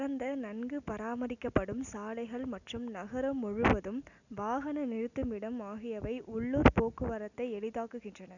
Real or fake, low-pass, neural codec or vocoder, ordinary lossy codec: real; 7.2 kHz; none; none